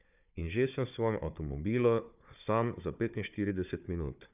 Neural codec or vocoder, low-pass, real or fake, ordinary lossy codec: codec, 16 kHz, 4 kbps, FunCodec, trained on Chinese and English, 50 frames a second; 3.6 kHz; fake; none